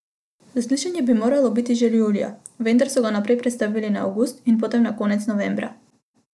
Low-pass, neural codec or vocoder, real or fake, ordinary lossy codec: none; none; real; none